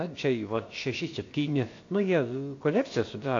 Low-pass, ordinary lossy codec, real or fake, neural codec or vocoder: 7.2 kHz; AAC, 48 kbps; fake; codec, 16 kHz, about 1 kbps, DyCAST, with the encoder's durations